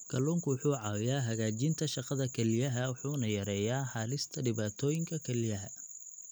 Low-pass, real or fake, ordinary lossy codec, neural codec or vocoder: none; real; none; none